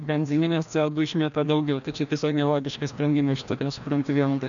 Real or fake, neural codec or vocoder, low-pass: fake; codec, 16 kHz, 1 kbps, FreqCodec, larger model; 7.2 kHz